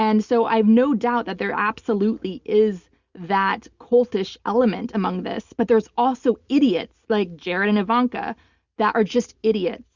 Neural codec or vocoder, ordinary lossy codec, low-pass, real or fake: none; Opus, 64 kbps; 7.2 kHz; real